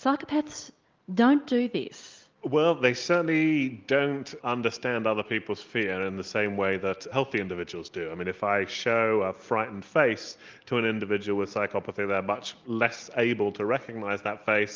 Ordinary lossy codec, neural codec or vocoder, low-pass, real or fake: Opus, 32 kbps; none; 7.2 kHz; real